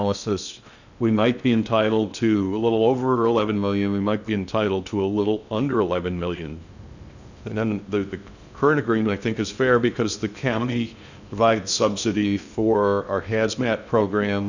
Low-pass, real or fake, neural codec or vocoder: 7.2 kHz; fake; codec, 16 kHz in and 24 kHz out, 0.6 kbps, FocalCodec, streaming, 2048 codes